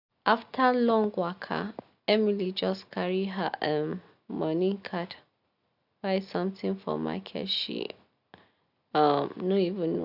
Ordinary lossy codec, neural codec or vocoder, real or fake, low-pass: none; none; real; 5.4 kHz